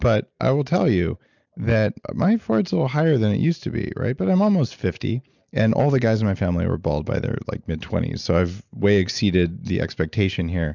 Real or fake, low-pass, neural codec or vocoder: real; 7.2 kHz; none